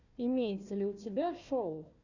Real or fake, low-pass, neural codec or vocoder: fake; 7.2 kHz; codec, 16 kHz, 1 kbps, FunCodec, trained on Chinese and English, 50 frames a second